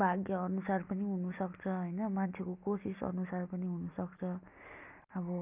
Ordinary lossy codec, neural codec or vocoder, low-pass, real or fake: none; none; 3.6 kHz; real